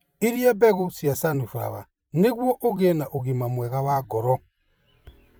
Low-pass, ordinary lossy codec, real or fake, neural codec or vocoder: none; none; fake; vocoder, 44.1 kHz, 128 mel bands every 256 samples, BigVGAN v2